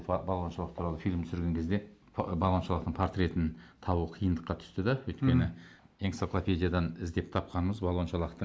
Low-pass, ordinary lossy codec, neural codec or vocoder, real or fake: none; none; none; real